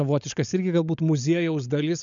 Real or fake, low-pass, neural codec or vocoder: fake; 7.2 kHz; codec, 16 kHz, 16 kbps, FunCodec, trained on LibriTTS, 50 frames a second